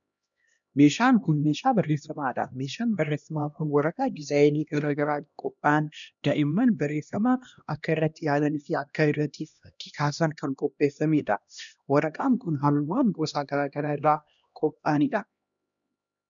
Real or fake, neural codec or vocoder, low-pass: fake; codec, 16 kHz, 1 kbps, X-Codec, HuBERT features, trained on LibriSpeech; 7.2 kHz